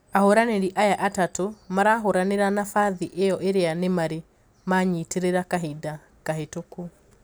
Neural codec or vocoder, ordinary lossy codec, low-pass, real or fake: none; none; none; real